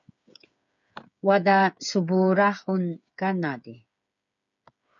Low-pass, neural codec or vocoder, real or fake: 7.2 kHz; codec, 16 kHz, 8 kbps, FreqCodec, smaller model; fake